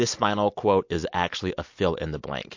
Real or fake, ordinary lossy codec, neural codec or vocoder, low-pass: real; MP3, 48 kbps; none; 7.2 kHz